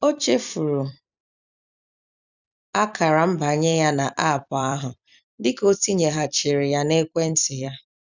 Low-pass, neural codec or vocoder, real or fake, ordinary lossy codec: 7.2 kHz; vocoder, 44.1 kHz, 128 mel bands every 256 samples, BigVGAN v2; fake; none